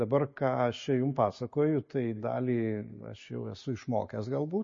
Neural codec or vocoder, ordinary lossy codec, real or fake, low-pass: none; MP3, 32 kbps; real; 7.2 kHz